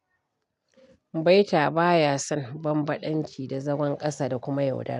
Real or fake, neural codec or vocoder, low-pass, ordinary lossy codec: real; none; 10.8 kHz; none